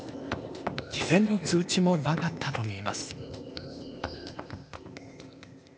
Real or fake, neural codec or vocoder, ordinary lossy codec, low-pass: fake; codec, 16 kHz, 0.8 kbps, ZipCodec; none; none